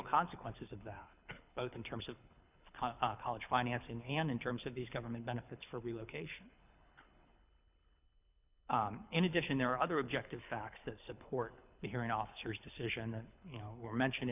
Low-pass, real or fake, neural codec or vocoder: 3.6 kHz; fake; codec, 24 kHz, 6 kbps, HILCodec